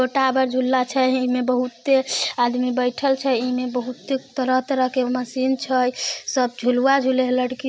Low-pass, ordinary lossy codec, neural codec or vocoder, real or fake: none; none; none; real